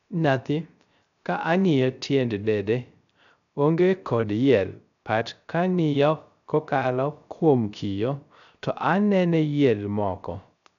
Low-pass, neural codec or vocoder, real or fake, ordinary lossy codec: 7.2 kHz; codec, 16 kHz, 0.3 kbps, FocalCodec; fake; none